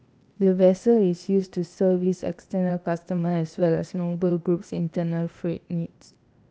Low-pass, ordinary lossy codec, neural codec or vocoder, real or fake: none; none; codec, 16 kHz, 0.8 kbps, ZipCodec; fake